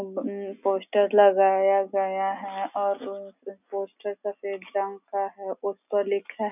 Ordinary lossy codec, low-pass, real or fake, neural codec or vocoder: none; 3.6 kHz; real; none